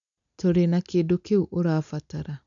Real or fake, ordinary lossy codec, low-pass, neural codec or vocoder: real; none; 7.2 kHz; none